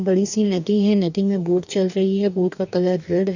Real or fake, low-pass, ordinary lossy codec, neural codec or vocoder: fake; 7.2 kHz; none; codec, 44.1 kHz, 2.6 kbps, DAC